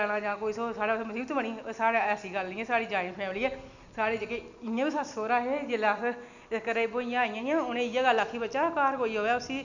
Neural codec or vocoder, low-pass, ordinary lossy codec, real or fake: none; 7.2 kHz; none; real